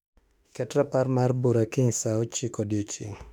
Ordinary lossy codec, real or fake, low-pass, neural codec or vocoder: none; fake; 19.8 kHz; autoencoder, 48 kHz, 32 numbers a frame, DAC-VAE, trained on Japanese speech